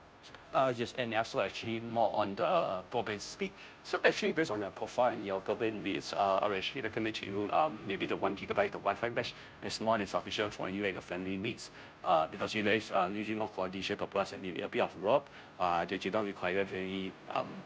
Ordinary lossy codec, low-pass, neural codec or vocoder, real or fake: none; none; codec, 16 kHz, 0.5 kbps, FunCodec, trained on Chinese and English, 25 frames a second; fake